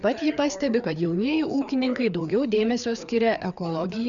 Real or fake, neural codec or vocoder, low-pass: fake; codec, 16 kHz, 4 kbps, FreqCodec, larger model; 7.2 kHz